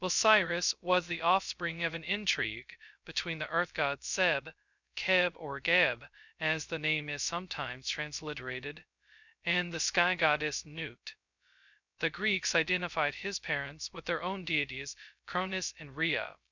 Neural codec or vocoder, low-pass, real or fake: codec, 16 kHz, 0.2 kbps, FocalCodec; 7.2 kHz; fake